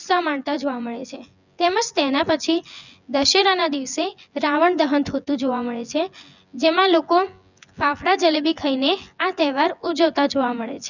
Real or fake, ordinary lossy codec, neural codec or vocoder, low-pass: fake; none; vocoder, 24 kHz, 100 mel bands, Vocos; 7.2 kHz